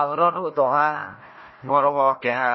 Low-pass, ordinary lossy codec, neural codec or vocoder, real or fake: 7.2 kHz; MP3, 24 kbps; codec, 16 kHz, 1 kbps, FunCodec, trained on Chinese and English, 50 frames a second; fake